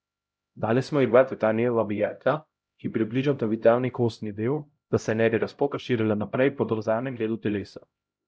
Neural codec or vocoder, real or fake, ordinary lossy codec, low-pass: codec, 16 kHz, 0.5 kbps, X-Codec, HuBERT features, trained on LibriSpeech; fake; none; none